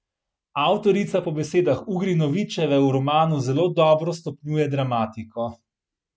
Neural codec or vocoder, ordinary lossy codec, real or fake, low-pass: none; none; real; none